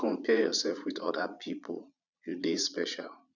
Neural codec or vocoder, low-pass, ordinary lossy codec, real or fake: vocoder, 22.05 kHz, 80 mel bands, Vocos; 7.2 kHz; none; fake